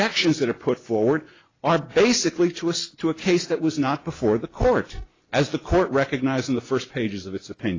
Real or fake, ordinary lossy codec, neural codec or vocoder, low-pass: real; AAC, 32 kbps; none; 7.2 kHz